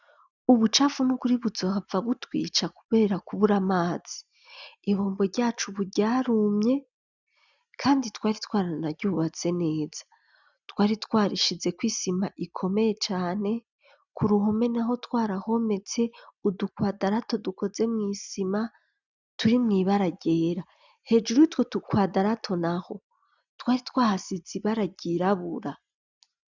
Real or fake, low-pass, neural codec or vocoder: real; 7.2 kHz; none